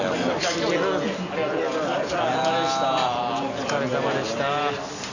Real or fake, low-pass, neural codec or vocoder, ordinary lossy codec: real; 7.2 kHz; none; none